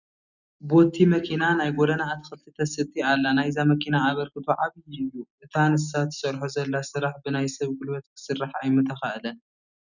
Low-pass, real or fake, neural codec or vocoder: 7.2 kHz; real; none